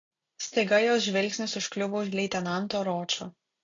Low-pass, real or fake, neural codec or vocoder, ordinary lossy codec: 7.2 kHz; real; none; AAC, 32 kbps